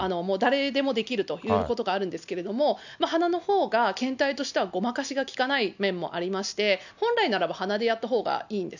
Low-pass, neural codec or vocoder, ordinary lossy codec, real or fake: 7.2 kHz; none; none; real